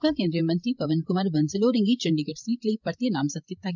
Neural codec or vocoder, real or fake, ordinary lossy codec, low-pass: codec, 16 kHz, 16 kbps, FreqCodec, larger model; fake; none; 7.2 kHz